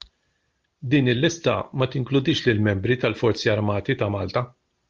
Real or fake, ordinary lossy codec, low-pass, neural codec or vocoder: real; Opus, 32 kbps; 7.2 kHz; none